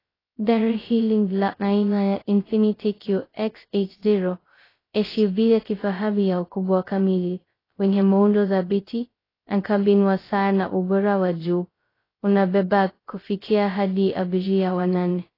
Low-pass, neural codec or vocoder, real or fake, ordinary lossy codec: 5.4 kHz; codec, 16 kHz, 0.2 kbps, FocalCodec; fake; AAC, 24 kbps